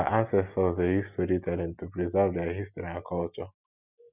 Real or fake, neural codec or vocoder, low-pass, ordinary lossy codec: real; none; 3.6 kHz; none